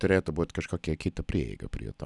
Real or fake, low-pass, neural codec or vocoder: real; 10.8 kHz; none